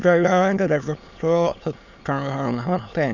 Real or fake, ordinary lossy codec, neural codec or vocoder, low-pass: fake; none; autoencoder, 22.05 kHz, a latent of 192 numbers a frame, VITS, trained on many speakers; 7.2 kHz